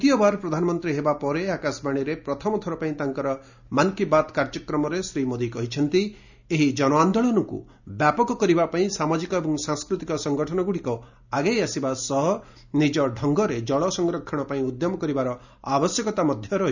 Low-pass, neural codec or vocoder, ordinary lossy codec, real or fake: 7.2 kHz; none; none; real